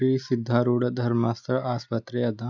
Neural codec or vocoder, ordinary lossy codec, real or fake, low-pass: none; none; real; 7.2 kHz